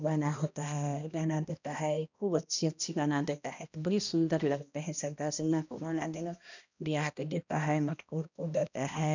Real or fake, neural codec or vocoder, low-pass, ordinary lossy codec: fake; codec, 16 kHz, 1 kbps, X-Codec, HuBERT features, trained on balanced general audio; 7.2 kHz; none